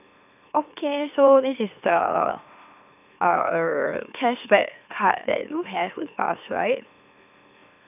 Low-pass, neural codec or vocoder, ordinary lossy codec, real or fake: 3.6 kHz; autoencoder, 44.1 kHz, a latent of 192 numbers a frame, MeloTTS; none; fake